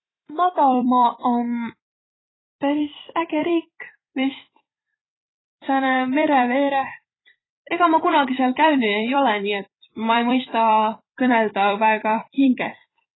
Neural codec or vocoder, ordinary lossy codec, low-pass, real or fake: vocoder, 44.1 kHz, 128 mel bands every 256 samples, BigVGAN v2; AAC, 16 kbps; 7.2 kHz; fake